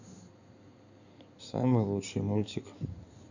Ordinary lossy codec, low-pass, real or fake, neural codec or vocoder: none; 7.2 kHz; fake; codec, 16 kHz in and 24 kHz out, 2.2 kbps, FireRedTTS-2 codec